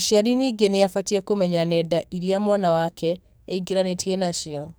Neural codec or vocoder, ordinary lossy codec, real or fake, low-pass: codec, 44.1 kHz, 2.6 kbps, SNAC; none; fake; none